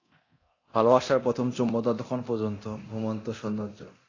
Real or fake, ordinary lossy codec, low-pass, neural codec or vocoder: fake; AAC, 32 kbps; 7.2 kHz; codec, 24 kHz, 0.9 kbps, DualCodec